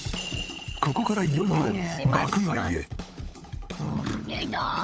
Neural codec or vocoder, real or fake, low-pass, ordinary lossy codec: codec, 16 kHz, 16 kbps, FunCodec, trained on LibriTTS, 50 frames a second; fake; none; none